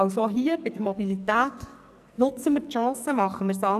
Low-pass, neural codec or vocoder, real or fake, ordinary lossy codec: 14.4 kHz; codec, 44.1 kHz, 2.6 kbps, SNAC; fake; none